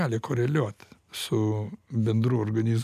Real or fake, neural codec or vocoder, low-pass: real; none; 14.4 kHz